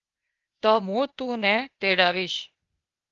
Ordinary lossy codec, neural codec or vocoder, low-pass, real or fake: Opus, 32 kbps; codec, 16 kHz, 0.8 kbps, ZipCodec; 7.2 kHz; fake